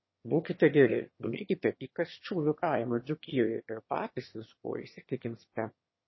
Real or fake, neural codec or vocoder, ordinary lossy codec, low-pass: fake; autoencoder, 22.05 kHz, a latent of 192 numbers a frame, VITS, trained on one speaker; MP3, 24 kbps; 7.2 kHz